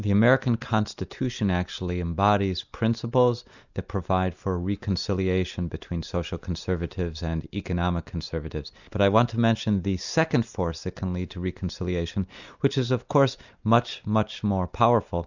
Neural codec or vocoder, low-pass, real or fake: none; 7.2 kHz; real